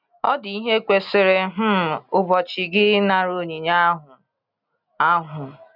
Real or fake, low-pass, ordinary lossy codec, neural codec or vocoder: real; 5.4 kHz; none; none